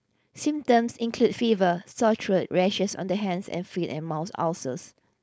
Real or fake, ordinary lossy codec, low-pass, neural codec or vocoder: fake; none; none; codec, 16 kHz, 4.8 kbps, FACodec